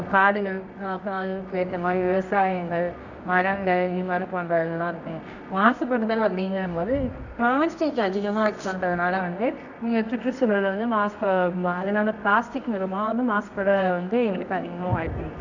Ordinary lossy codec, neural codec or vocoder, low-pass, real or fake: none; codec, 24 kHz, 0.9 kbps, WavTokenizer, medium music audio release; 7.2 kHz; fake